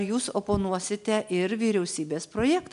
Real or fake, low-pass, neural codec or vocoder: real; 10.8 kHz; none